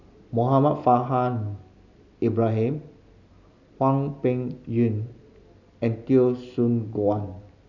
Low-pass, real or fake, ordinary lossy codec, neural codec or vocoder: 7.2 kHz; real; none; none